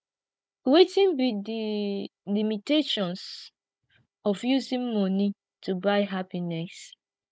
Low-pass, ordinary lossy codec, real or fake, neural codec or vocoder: none; none; fake; codec, 16 kHz, 16 kbps, FunCodec, trained on Chinese and English, 50 frames a second